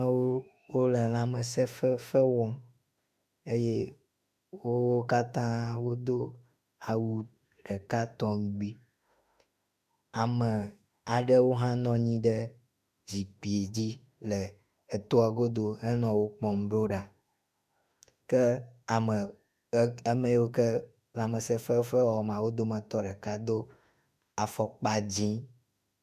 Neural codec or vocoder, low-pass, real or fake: autoencoder, 48 kHz, 32 numbers a frame, DAC-VAE, trained on Japanese speech; 14.4 kHz; fake